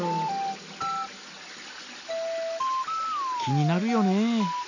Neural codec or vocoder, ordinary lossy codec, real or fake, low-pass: none; none; real; 7.2 kHz